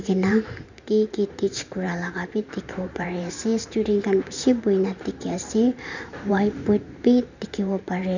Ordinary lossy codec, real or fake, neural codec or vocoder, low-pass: none; fake; vocoder, 44.1 kHz, 128 mel bands every 512 samples, BigVGAN v2; 7.2 kHz